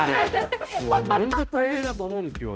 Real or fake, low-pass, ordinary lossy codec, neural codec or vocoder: fake; none; none; codec, 16 kHz, 0.5 kbps, X-Codec, HuBERT features, trained on balanced general audio